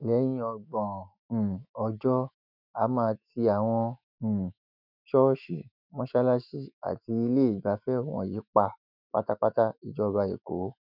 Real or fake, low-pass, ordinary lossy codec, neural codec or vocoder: fake; 5.4 kHz; none; autoencoder, 48 kHz, 128 numbers a frame, DAC-VAE, trained on Japanese speech